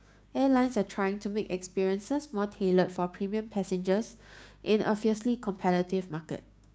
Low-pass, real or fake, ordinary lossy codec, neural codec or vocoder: none; fake; none; codec, 16 kHz, 6 kbps, DAC